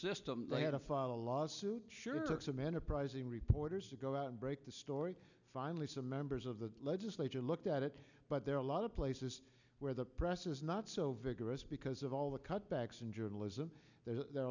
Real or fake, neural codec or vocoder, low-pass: real; none; 7.2 kHz